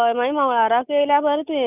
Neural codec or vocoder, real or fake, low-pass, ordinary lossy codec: none; real; 3.6 kHz; none